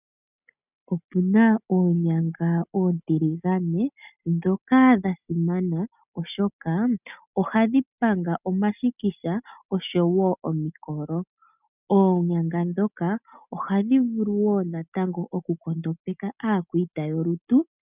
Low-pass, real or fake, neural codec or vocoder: 3.6 kHz; real; none